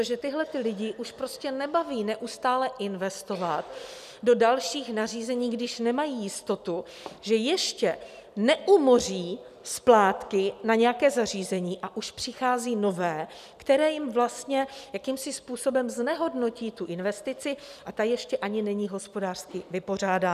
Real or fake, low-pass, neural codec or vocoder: real; 14.4 kHz; none